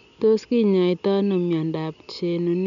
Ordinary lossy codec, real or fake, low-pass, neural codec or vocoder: none; real; 7.2 kHz; none